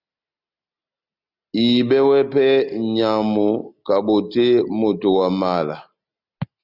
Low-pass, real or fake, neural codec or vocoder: 5.4 kHz; real; none